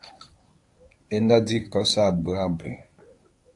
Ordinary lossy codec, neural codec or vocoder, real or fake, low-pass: AAC, 64 kbps; codec, 24 kHz, 0.9 kbps, WavTokenizer, medium speech release version 1; fake; 10.8 kHz